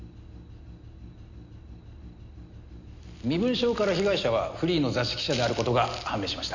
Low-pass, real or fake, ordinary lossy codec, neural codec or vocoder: 7.2 kHz; real; Opus, 64 kbps; none